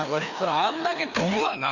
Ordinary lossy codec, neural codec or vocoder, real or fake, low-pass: none; codec, 16 kHz, 2 kbps, FreqCodec, larger model; fake; 7.2 kHz